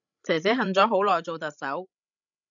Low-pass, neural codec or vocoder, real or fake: 7.2 kHz; codec, 16 kHz, 16 kbps, FreqCodec, larger model; fake